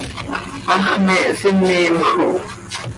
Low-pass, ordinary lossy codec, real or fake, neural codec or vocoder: 10.8 kHz; MP3, 48 kbps; real; none